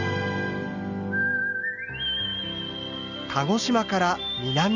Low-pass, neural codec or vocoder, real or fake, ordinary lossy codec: 7.2 kHz; none; real; none